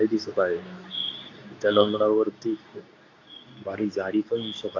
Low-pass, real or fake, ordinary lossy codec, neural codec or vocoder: 7.2 kHz; fake; AAC, 32 kbps; codec, 24 kHz, 0.9 kbps, WavTokenizer, medium speech release version 2